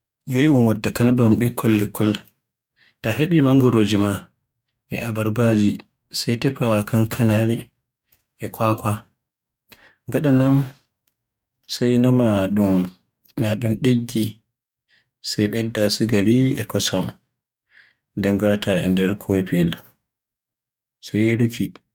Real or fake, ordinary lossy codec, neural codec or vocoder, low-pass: fake; none; codec, 44.1 kHz, 2.6 kbps, DAC; 19.8 kHz